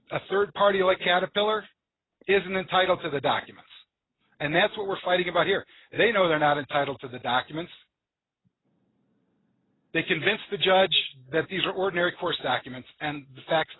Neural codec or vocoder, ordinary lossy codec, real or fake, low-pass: none; AAC, 16 kbps; real; 7.2 kHz